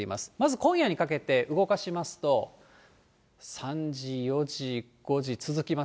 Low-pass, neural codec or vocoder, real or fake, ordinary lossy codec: none; none; real; none